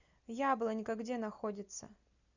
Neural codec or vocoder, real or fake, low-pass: none; real; 7.2 kHz